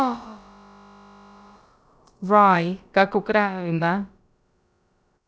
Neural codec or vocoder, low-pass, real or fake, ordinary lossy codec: codec, 16 kHz, about 1 kbps, DyCAST, with the encoder's durations; none; fake; none